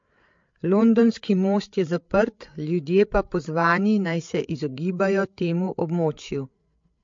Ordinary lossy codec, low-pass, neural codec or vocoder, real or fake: MP3, 48 kbps; 7.2 kHz; codec, 16 kHz, 8 kbps, FreqCodec, larger model; fake